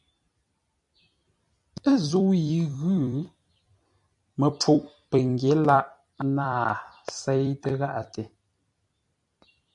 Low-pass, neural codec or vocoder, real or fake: 10.8 kHz; vocoder, 44.1 kHz, 128 mel bands every 256 samples, BigVGAN v2; fake